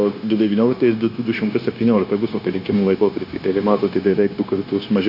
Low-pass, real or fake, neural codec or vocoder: 5.4 kHz; fake; codec, 16 kHz, 0.9 kbps, LongCat-Audio-Codec